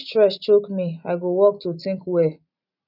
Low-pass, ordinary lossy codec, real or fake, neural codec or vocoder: 5.4 kHz; none; real; none